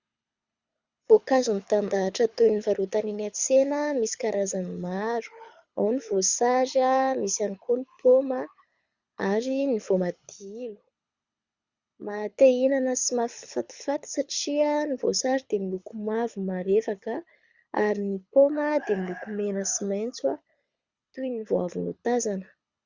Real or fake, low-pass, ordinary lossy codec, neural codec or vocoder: fake; 7.2 kHz; Opus, 64 kbps; codec, 24 kHz, 6 kbps, HILCodec